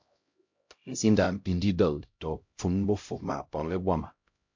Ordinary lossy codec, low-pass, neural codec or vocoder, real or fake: MP3, 48 kbps; 7.2 kHz; codec, 16 kHz, 0.5 kbps, X-Codec, HuBERT features, trained on LibriSpeech; fake